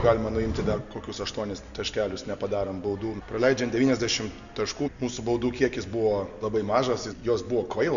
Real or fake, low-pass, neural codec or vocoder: real; 7.2 kHz; none